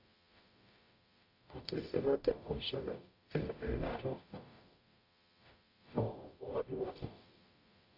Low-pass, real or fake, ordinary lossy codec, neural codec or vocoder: 5.4 kHz; fake; AAC, 48 kbps; codec, 44.1 kHz, 0.9 kbps, DAC